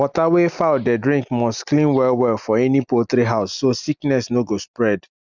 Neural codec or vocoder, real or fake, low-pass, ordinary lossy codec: none; real; 7.2 kHz; none